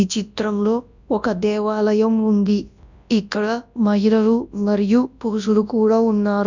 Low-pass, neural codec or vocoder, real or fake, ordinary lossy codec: 7.2 kHz; codec, 24 kHz, 0.9 kbps, WavTokenizer, large speech release; fake; none